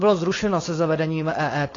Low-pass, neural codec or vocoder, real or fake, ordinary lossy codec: 7.2 kHz; codec, 16 kHz, 4.8 kbps, FACodec; fake; AAC, 32 kbps